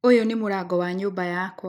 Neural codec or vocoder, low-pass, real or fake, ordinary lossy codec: none; 19.8 kHz; real; none